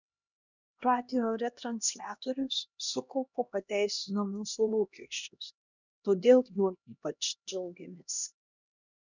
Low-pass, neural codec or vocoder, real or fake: 7.2 kHz; codec, 16 kHz, 1 kbps, X-Codec, HuBERT features, trained on LibriSpeech; fake